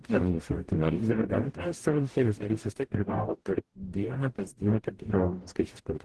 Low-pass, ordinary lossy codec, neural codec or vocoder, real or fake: 10.8 kHz; Opus, 16 kbps; codec, 44.1 kHz, 0.9 kbps, DAC; fake